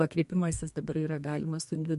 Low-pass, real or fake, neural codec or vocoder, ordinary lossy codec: 14.4 kHz; fake; codec, 32 kHz, 1.9 kbps, SNAC; MP3, 48 kbps